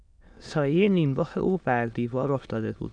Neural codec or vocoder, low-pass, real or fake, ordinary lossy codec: autoencoder, 22.05 kHz, a latent of 192 numbers a frame, VITS, trained on many speakers; 9.9 kHz; fake; MP3, 96 kbps